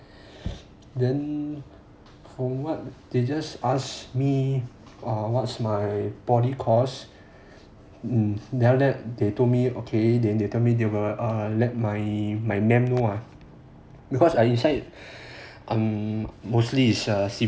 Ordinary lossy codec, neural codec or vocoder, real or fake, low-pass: none; none; real; none